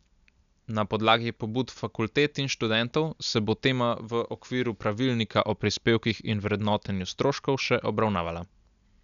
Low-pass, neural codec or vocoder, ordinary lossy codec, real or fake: 7.2 kHz; none; none; real